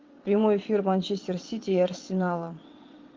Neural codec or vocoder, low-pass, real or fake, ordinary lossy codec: vocoder, 22.05 kHz, 80 mel bands, WaveNeXt; 7.2 kHz; fake; Opus, 32 kbps